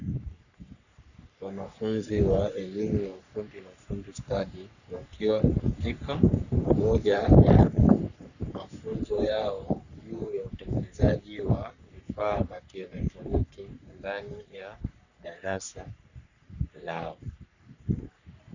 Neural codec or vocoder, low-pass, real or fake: codec, 44.1 kHz, 3.4 kbps, Pupu-Codec; 7.2 kHz; fake